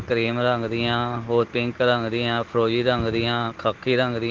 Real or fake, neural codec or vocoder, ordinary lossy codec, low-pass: real; none; Opus, 16 kbps; 7.2 kHz